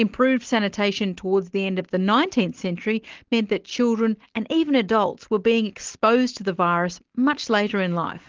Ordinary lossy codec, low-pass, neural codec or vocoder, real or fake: Opus, 16 kbps; 7.2 kHz; none; real